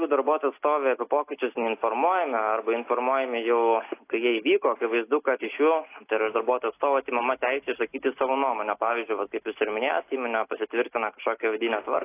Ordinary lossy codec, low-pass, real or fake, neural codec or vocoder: AAC, 24 kbps; 3.6 kHz; real; none